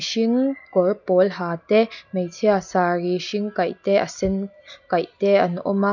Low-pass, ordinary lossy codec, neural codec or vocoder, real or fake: 7.2 kHz; none; none; real